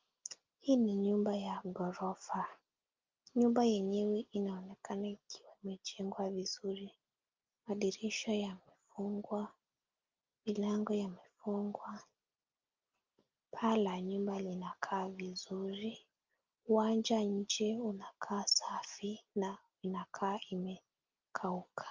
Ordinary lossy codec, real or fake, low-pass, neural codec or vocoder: Opus, 24 kbps; real; 7.2 kHz; none